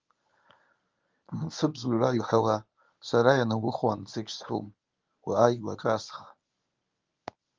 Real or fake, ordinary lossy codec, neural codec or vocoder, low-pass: fake; Opus, 24 kbps; codec, 24 kHz, 0.9 kbps, WavTokenizer, medium speech release version 1; 7.2 kHz